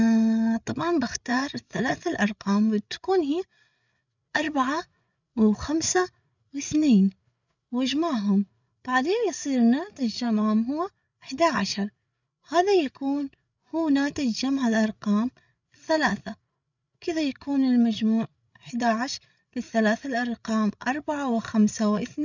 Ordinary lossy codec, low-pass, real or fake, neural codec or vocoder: none; 7.2 kHz; fake; codec, 16 kHz, 8 kbps, FreqCodec, larger model